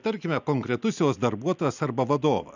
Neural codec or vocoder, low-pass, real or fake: none; 7.2 kHz; real